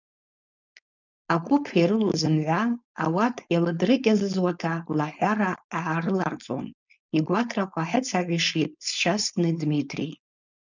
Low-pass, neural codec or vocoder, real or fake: 7.2 kHz; codec, 16 kHz, 4.8 kbps, FACodec; fake